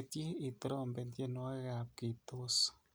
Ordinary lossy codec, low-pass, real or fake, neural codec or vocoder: none; none; real; none